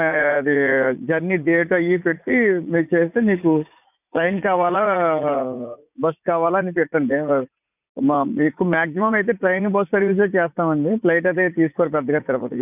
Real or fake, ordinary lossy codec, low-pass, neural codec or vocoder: fake; none; 3.6 kHz; vocoder, 44.1 kHz, 80 mel bands, Vocos